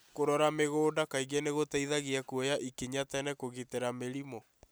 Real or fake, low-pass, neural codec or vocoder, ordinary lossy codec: real; none; none; none